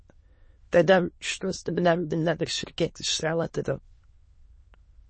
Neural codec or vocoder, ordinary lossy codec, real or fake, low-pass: autoencoder, 22.05 kHz, a latent of 192 numbers a frame, VITS, trained on many speakers; MP3, 32 kbps; fake; 9.9 kHz